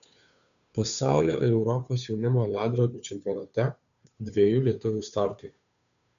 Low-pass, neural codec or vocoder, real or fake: 7.2 kHz; codec, 16 kHz, 2 kbps, FunCodec, trained on Chinese and English, 25 frames a second; fake